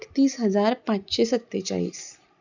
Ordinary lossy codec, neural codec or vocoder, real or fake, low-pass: none; none; real; 7.2 kHz